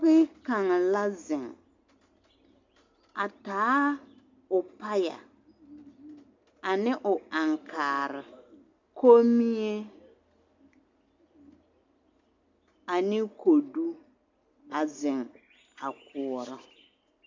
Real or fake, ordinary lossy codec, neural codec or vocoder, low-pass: real; MP3, 64 kbps; none; 7.2 kHz